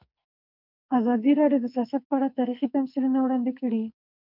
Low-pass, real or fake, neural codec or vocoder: 5.4 kHz; fake; codec, 44.1 kHz, 2.6 kbps, SNAC